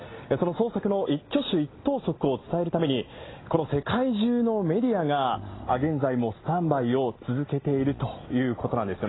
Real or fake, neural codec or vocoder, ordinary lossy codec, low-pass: real; none; AAC, 16 kbps; 7.2 kHz